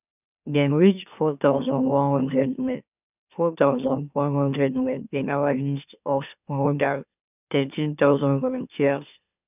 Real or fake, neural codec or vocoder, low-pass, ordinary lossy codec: fake; autoencoder, 44.1 kHz, a latent of 192 numbers a frame, MeloTTS; 3.6 kHz; none